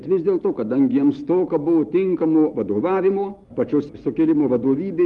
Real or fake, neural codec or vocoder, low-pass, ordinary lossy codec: real; none; 10.8 kHz; Opus, 24 kbps